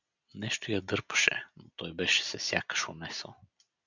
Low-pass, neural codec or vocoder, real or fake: 7.2 kHz; none; real